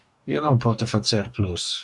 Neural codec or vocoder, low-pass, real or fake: codec, 44.1 kHz, 2.6 kbps, DAC; 10.8 kHz; fake